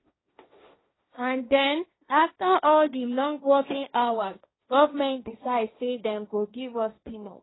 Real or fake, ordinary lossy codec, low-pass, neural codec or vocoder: fake; AAC, 16 kbps; 7.2 kHz; codec, 16 kHz, 1.1 kbps, Voila-Tokenizer